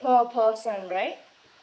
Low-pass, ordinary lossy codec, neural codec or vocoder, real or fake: none; none; codec, 16 kHz, 4 kbps, X-Codec, HuBERT features, trained on general audio; fake